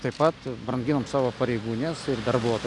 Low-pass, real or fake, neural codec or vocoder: 10.8 kHz; real; none